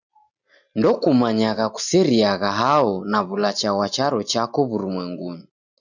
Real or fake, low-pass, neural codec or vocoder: real; 7.2 kHz; none